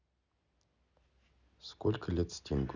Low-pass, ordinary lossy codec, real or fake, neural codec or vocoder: 7.2 kHz; none; real; none